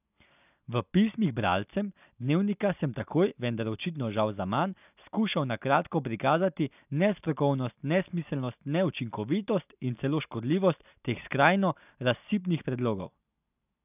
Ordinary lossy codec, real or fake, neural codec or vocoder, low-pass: none; real; none; 3.6 kHz